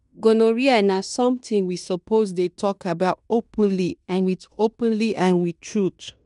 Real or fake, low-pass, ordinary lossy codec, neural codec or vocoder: fake; 10.8 kHz; none; codec, 16 kHz in and 24 kHz out, 0.9 kbps, LongCat-Audio-Codec, fine tuned four codebook decoder